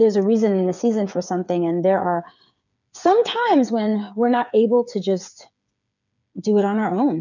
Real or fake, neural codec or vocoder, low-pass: fake; codec, 16 kHz, 8 kbps, FreqCodec, smaller model; 7.2 kHz